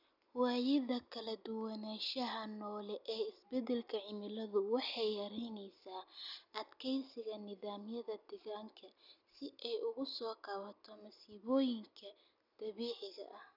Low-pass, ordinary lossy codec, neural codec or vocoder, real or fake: 5.4 kHz; none; none; real